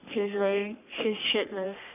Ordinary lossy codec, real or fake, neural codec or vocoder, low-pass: none; fake; codec, 44.1 kHz, 3.4 kbps, Pupu-Codec; 3.6 kHz